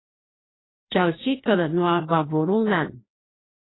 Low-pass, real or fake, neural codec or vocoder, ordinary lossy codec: 7.2 kHz; fake; codec, 16 kHz, 1 kbps, FreqCodec, larger model; AAC, 16 kbps